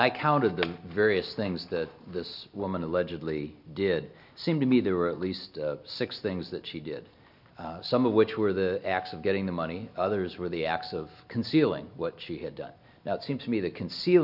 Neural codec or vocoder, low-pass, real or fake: none; 5.4 kHz; real